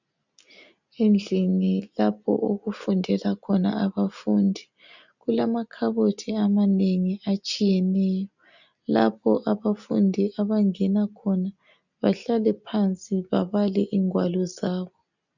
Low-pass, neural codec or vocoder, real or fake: 7.2 kHz; none; real